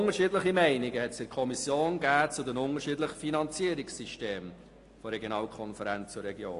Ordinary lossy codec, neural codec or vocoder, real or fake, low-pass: AAC, 48 kbps; none; real; 10.8 kHz